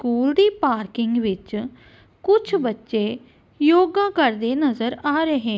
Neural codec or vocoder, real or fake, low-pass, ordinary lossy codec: none; real; none; none